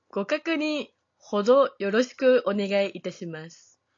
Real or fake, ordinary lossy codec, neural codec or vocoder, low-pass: real; AAC, 48 kbps; none; 7.2 kHz